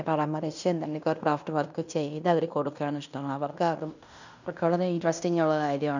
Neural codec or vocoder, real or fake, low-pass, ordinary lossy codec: codec, 16 kHz in and 24 kHz out, 0.9 kbps, LongCat-Audio-Codec, fine tuned four codebook decoder; fake; 7.2 kHz; none